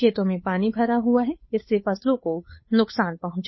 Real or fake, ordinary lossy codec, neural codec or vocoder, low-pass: fake; MP3, 24 kbps; codec, 16 kHz, 8 kbps, FunCodec, trained on Chinese and English, 25 frames a second; 7.2 kHz